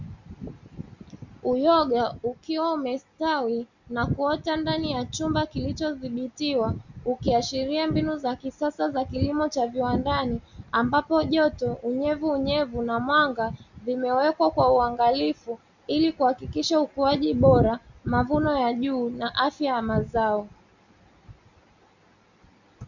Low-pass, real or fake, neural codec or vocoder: 7.2 kHz; real; none